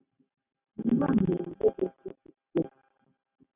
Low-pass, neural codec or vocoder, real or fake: 3.6 kHz; none; real